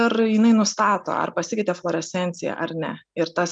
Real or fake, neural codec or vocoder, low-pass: real; none; 9.9 kHz